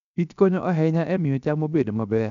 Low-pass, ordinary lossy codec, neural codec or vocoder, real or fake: 7.2 kHz; none; codec, 16 kHz, 0.7 kbps, FocalCodec; fake